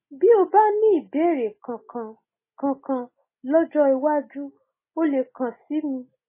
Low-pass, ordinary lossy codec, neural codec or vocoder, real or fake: 3.6 kHz; MP3, 16 kbps; none; real